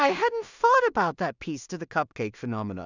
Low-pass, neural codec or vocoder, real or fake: 7.2 kHz; codec, 16 kHz in and 24 kHz out, 0.4 kbps, LongCat-Audio-Codec, two codebook decoder; fake